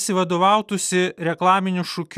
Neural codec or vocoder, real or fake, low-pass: none; real; 14.4 kHz